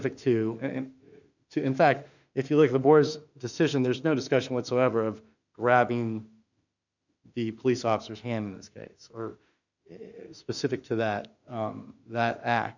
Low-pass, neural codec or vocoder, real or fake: 7.2 kHz; autoencoder, 48 kHz, 32 numbers a frame, DAC-VAE, trained on Japanese speech; fake